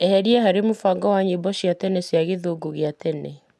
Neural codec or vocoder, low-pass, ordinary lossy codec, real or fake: none; none; none; real